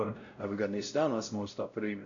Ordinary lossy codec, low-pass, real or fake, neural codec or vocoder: MP3, 96 kbps; 7.2 kHz; fake; codec, 16 kHz, 0.5 kbps, X-Codec, WavLM features, trained on Multilingual LibriSpeech